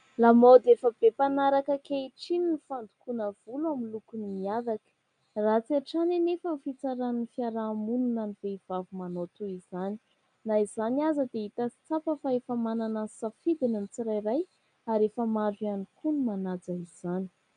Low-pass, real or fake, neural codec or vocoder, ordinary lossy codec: 9.9 kHz; real; none; MP3, 96 kbps